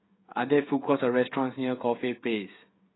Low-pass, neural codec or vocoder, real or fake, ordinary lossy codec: 7.2 kHz; codec, 16 kHz, 16 kbps, FreqCodec, smaller model; fake; AAC, 16 kbps